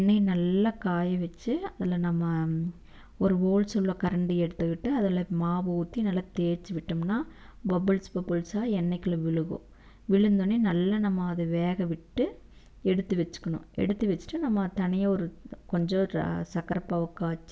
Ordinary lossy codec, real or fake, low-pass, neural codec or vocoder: none; real; none; none